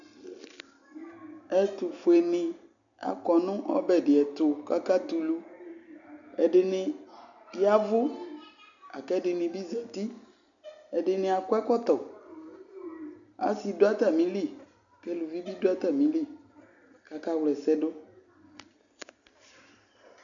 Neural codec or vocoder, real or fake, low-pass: none; real; 7.2 kHz